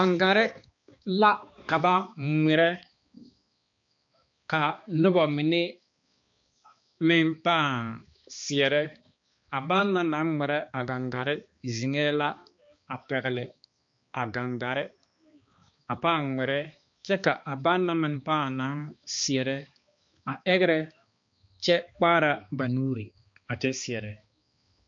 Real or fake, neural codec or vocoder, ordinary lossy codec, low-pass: fake; codec, 16 kHz, 2 kbps, X-Codec, HuBERT features, trained on balanced general audio; MP3, 48 kbps; 7.2 kHz